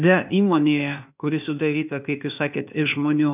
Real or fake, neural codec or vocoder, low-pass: fake; codec, 16 kHz, 0.8 kbps, ZipCodec; 3.6 kHz